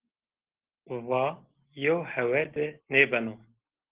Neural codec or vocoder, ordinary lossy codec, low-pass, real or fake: none; Opus, 16 kbps; 3.6 kHz; real